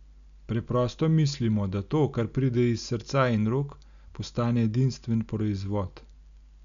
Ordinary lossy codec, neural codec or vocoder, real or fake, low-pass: none; none; real; 7.2 kHz